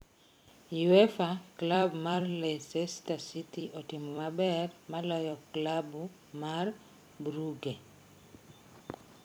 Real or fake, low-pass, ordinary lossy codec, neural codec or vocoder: fake; none; none; vocoder, 44.1 kHz, 128 mel bands every 256 samples, BigVGAN v2